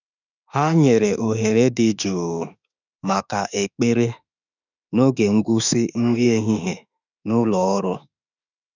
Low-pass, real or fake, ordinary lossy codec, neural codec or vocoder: 7.2 kHz; fake; none; autoencoder, 48 kHz, 32 numbers a frame, DAC-VAE, trained on Japanese speech